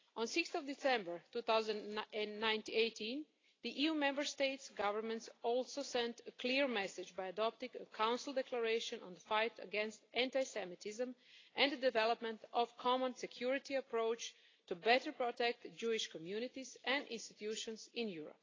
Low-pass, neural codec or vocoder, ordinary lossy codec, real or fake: 7.2 kHz; none; AAC, 32 kbps; real